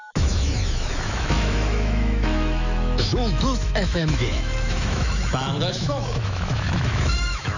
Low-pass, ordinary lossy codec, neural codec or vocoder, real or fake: 7.2 kHz; none; autoencoder, 48 kHz, 128 numbers a frame, DAC-VAE, trained on Japanese speech; fake